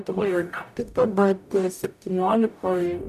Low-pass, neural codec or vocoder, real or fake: 14.4 kHz; codec, 44.1 kHz, 0.9 kbps, DAC; fake